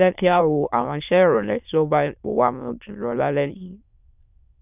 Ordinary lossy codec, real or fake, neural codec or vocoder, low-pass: none; fake; autoencoder, 22.05 kHz, a latent of 192 numbers a frame, VITS, trained on many speakers; 3.6 kHz